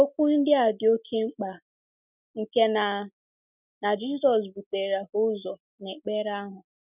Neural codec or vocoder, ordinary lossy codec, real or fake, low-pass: none; none; real; 3.6 kHz